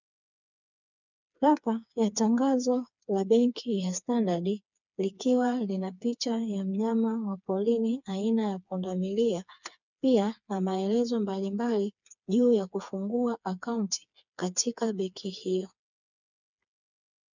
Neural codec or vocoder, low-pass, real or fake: codec, 16 kHz, 4 kbps, FreqCodec, smaller model; 7.2 kHz; fake